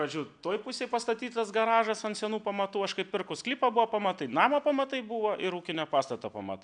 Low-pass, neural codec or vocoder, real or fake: 9.9 kHz; none; real